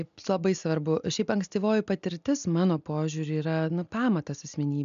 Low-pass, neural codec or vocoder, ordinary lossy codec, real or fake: 7.2 kHz; none; MP3, 64 kbps; real